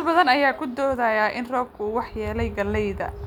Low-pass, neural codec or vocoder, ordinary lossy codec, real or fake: 19.8 kHz; none; none; real